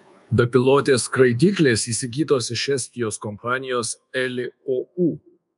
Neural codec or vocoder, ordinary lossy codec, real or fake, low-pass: codec, 24 kHz, 1.2 kbps, DualCodec; MP3, 96 kbps; fake; 10.8 kHz